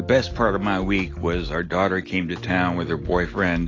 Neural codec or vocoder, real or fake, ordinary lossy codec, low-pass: none; real; AAC, 32 kbps; 7.2 kHz